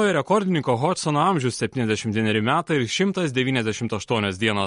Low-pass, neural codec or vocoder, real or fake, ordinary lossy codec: 10.8 kHz; none; real; MP3, 48 kbps